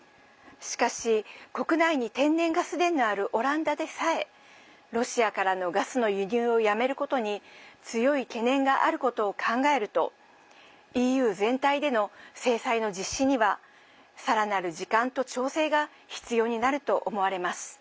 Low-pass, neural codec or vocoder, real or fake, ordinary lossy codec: none; none; real; none